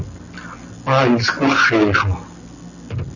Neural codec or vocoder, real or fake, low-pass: none; real; 7.2 kHz